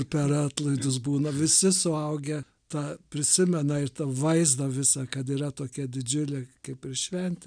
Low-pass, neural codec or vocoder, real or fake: 9.9 kHz; none; real